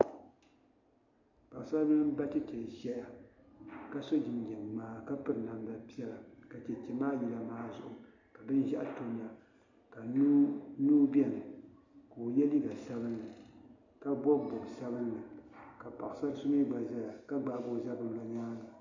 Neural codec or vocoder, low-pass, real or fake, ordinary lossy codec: none; 7.2 kHz; real; AAC, 48 kbps